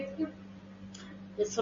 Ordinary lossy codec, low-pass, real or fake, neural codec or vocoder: MP3, 32 kbps; 7.2 kHz; real; none